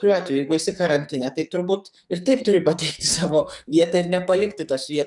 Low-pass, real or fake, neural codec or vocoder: 10.8 kHz; fake; codec, 44.1 kHz, 2.6 kbps, SNAC